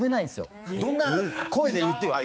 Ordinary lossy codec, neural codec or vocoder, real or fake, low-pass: none; codec, 16 kHz, 4 kbps, X-Codec, HuBERT features, trained on balanced general audio; fake; none